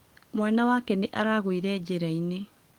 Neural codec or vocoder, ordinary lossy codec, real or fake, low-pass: codec, 44.1 kHz, 7.8 kbps, DAC; Opus, 24 kbps; fake; 19.8 kHz